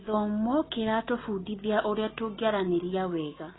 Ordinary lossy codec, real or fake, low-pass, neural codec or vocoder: AAC, 16 kbps; real; 7.2 kHz; none